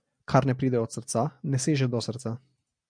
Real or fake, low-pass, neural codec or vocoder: real; 9.9 kHz; none